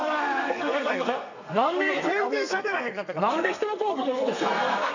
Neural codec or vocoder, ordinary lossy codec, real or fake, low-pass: codec, 32 kHz, 1.9 kbps, SNAC; none; fake; 7.2 kHz